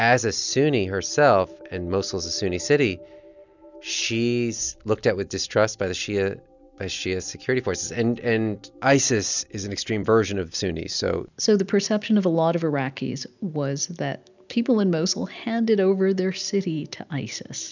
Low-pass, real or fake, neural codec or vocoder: 7.2 kHz; real; none